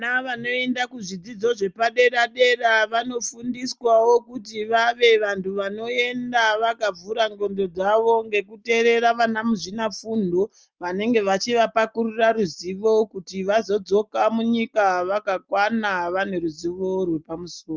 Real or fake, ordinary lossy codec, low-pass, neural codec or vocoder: real; Opus, 24 kbps; 7.2 kHz; none